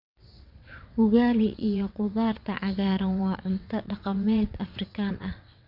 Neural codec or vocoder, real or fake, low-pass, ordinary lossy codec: vocoder, 22.05 kHz, 80 mel bands, WaveNeXt; fake; 5.4 kHz; none